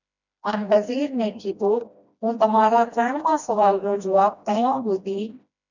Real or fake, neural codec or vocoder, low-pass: fake; codec, 16 kHz, 1 kbps, FreqCodec, smaller model; 7.2 kHz